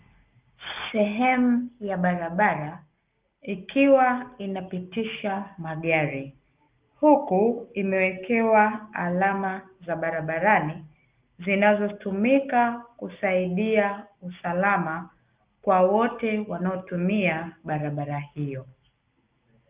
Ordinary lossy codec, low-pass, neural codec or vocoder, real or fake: Opus, 24 kbps; 3.6 kHz; none; real